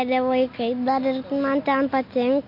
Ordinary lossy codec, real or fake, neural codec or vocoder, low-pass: MP3, 32 kbps; real; none; 5.4 kHz